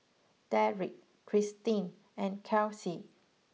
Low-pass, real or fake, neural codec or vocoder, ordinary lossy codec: none; real; none; none